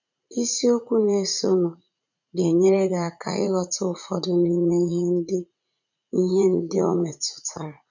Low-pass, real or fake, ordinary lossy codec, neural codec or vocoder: 7.2 kHz; fake; none; vocoder, 44.1 kHz, 80 mel bands, Vocos